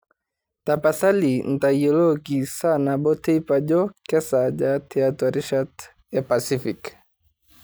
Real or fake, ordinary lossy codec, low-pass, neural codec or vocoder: real; none; none; none